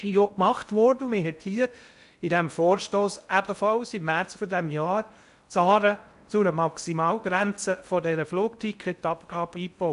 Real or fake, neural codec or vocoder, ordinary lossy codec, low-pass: fake; codec, 16 kHz in and 24 kHz out, 0.6 kbps, FocalCodec, streaming, 4096 codes; none; 10.8 kHz